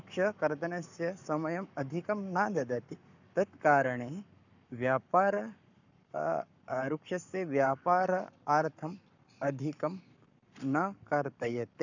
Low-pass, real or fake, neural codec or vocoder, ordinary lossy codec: 7.2 kHz; fake; codec, 44.1 kHz, 7.8 kbps, Pupu-Codec; none